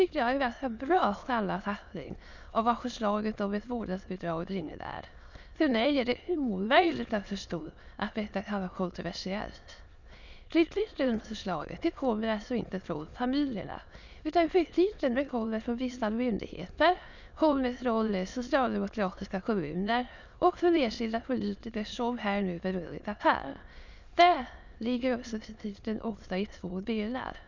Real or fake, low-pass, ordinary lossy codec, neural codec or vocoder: fake; 7.2 kHz; none; autoencoder, 22.05 kHz, a latent of 192 numbers a frame, VITS, trained on many speakers